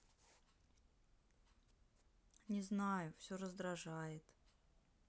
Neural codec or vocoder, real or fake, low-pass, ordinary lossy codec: none; real; none; none